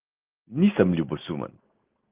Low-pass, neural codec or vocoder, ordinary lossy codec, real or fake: 3.6 kHz; none; Opus, 24 kbps; real